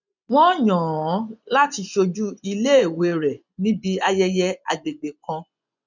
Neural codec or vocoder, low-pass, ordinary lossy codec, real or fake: vocoder, 24 kHz, 100 mel bands, Vocos; 7.2 kHz; none; fake